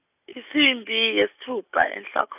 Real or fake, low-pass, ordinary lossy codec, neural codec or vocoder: real; 3.6 kHz; none; none